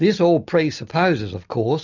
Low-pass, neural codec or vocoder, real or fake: 7.2 kHz; none; real